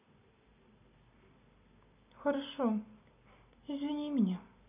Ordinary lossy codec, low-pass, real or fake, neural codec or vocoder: none; 3.6 kHz; real; none